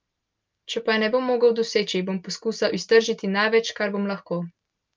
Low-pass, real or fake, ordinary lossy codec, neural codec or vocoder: 7.2 kHz; real; Opus, 24 kbps; none